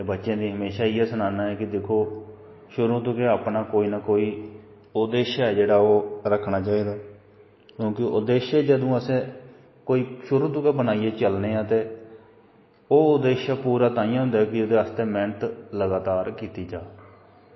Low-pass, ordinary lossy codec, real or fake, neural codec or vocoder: 7.2 kHz; MP3, 24 kbps; real; none